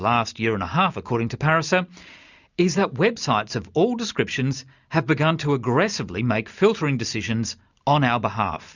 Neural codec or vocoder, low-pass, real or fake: none; 7.2 kHz; real